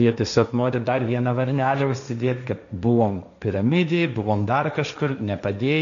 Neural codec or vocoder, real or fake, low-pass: codec, 16 kHz, 1.1 kbps, Voila-Tokenizer; fake; 7.2 kHz